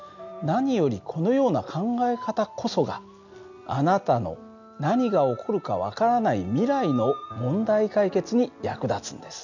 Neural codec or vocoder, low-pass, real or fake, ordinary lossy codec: none; 7.2 kHz; real; none